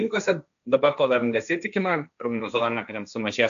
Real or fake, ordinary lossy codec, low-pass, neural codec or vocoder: fake; AAC, 96 kbps; 7.2 kHz; codec, 16 kHz, 1.1 kbps, Voila-Tokenizer